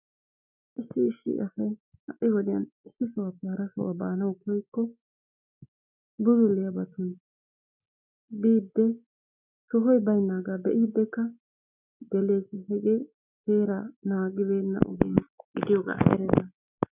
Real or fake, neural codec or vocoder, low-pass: real; none; 3.6 kHz